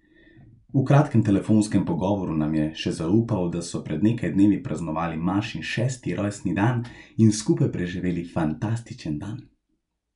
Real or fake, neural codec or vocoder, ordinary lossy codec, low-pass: real; none; none; 10.8 kHz